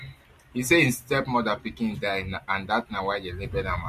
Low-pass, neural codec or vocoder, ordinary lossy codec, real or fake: 14.4 kHz; none; MP3, 64 kbps; real